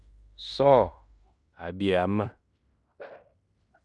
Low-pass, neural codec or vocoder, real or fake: 10.8 kHz; codec, 16 kHz in and 24 kHz out, 0.9 kbps, LongCat-Audio-Codec, fine tuned four codebook decoder; fake